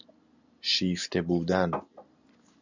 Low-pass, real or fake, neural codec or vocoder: 7.2 kHz; real; none